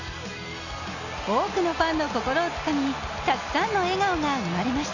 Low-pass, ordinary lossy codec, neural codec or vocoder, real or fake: 7.2 kHz; none; none; real